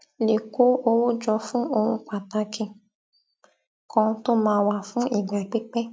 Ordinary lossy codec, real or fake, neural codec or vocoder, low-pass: none; real; none; none